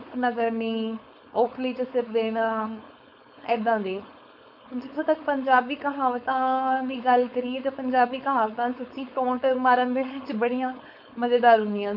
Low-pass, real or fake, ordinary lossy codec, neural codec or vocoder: 5.4 kHz; fake; none; codec, 16 kHz, 4.8 kbps, FACodec